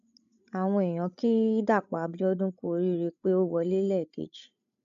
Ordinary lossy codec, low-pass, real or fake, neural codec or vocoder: none; 7.2 kHz; fake; codec, 16 kHz, 16 kbps, FreqCodec, larger model